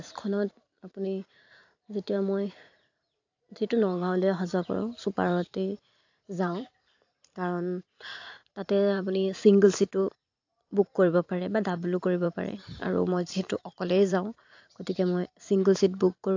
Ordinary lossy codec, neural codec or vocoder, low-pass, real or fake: AAC, 48 kbps; vocoder, 44.1 kHz, 128 mel bands every 512 samples, BigVGAN v2; 7.2 kHz; fake